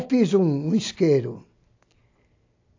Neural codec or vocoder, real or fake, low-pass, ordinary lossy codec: none; real; 7.2 kHz; AAC, 48 kbps